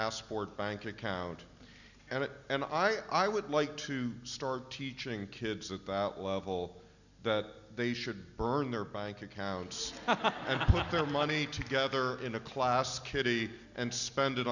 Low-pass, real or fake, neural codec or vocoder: 7.2 kHz; real; none